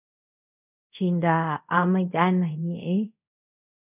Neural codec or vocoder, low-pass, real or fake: codec, 24 kHz, 0.5 kbps, DualCodec; 3.6 kHz; fake